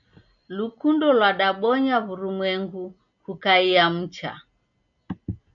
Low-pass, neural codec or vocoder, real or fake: 7.2 kHz; none; real